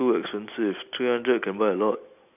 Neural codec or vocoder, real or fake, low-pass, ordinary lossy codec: none; real; 3.6 kHz; none